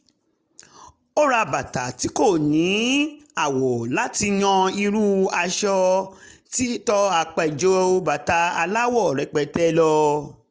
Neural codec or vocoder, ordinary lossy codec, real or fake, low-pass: none; none; real; none